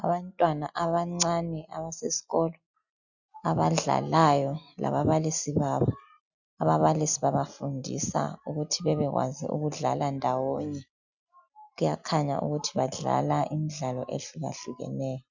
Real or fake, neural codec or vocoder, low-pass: real; none; 7.2 kHz